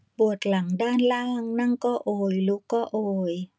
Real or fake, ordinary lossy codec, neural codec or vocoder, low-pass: real; none; none; none